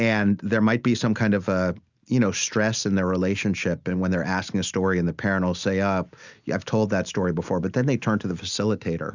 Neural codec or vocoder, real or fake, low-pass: none; real; 7.2 kHz